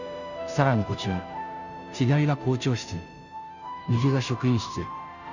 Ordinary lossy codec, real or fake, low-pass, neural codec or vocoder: none; fake; 7.2 kHz; codec, 16 kHz, 0.5 kbps, FunCodec, trained on Chinese and English, 25 frames a second